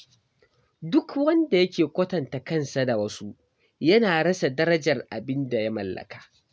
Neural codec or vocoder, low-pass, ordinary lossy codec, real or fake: none; none; none; real